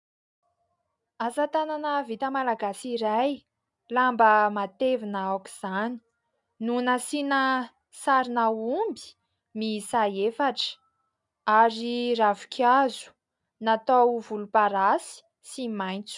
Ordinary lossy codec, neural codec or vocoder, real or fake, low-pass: MP3, 96 kbps; none; real; 10.8 kHz